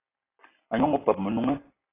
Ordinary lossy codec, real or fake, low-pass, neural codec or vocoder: AAC, 16 kbps; real; 3.6 kHz; none